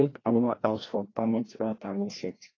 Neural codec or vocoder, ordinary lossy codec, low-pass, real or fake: codec, 16 kHz, 1 kbps, FreqCodec, larger model; AAC, 32 kbps; 7.2 kHz; fake